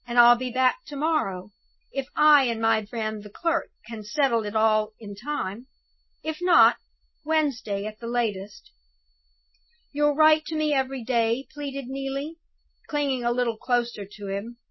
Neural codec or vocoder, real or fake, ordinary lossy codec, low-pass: none; real; MP3, 24 kbps; 7.2 kHz